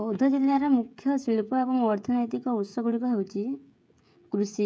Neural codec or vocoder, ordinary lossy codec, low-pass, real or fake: codec, 16 kHz, 16 kbps, FreqCodec, smaller model; none; none; fake